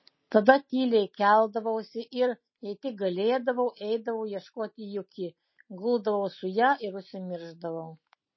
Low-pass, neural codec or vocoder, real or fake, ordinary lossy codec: 7.2 kHz; none; real; MP3, 24 kbps